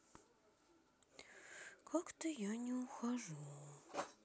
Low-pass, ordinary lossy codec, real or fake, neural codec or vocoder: none; none; real; none